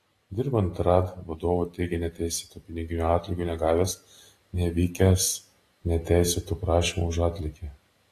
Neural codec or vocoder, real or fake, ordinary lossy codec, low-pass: none; real; AAC, 48 kbps; 14.4 kHz